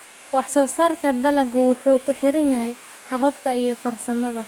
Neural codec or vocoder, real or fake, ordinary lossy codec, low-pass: codec, 44.1 kHz, 2.6 kbps, DAC; fake; none; 19.8 kHz